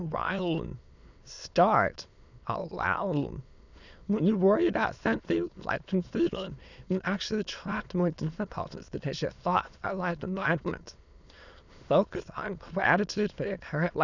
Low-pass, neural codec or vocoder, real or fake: 7.2 kHz; autoencoder, 22.05 kHz, a latent of 192 numbers a frame, VITS, trained on many speakers; fake